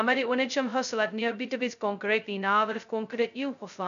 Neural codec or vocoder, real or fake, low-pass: codec, 16 kHz, 0.2 kbps, FocalCodec; fake; 7.2 kHz